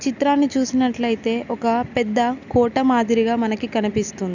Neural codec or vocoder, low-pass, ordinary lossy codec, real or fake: none; 7.2 kHz; none; real